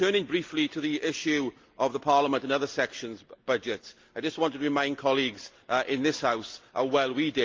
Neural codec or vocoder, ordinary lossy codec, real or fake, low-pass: none; Opus, 32 kbps; real; 7.2 kHz